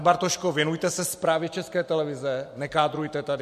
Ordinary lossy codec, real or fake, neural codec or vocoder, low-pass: MP3, 64 kbps; real; none; 14.4 kHz